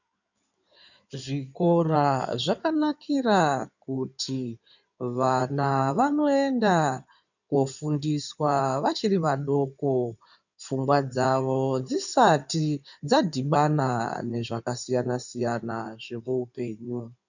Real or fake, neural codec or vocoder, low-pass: fake; codec, 16 kHz in and 24 kHz out, 2.2 kbps, FireRedTTS-2 codec; 7.2 kHz